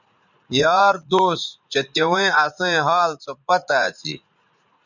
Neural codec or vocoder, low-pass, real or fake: vocoder, 44.1 kHz, 80 mel bands, Vocos; 7.2 kHz; fake